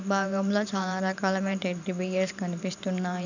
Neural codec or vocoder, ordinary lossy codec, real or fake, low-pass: vocoder, 44.1 kHz, 128 mel bands every 512 samples, BigVGAN v2; none; fake; 7.2 kHz